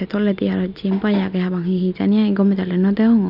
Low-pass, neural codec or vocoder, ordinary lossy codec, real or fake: 5.4 kHz; none; none; real